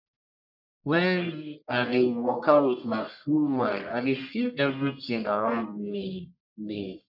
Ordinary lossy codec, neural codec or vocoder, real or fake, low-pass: none; codec, 44.1 kHz, 1.7 kbps, Pupu-Codec; fake; 5.4 kHz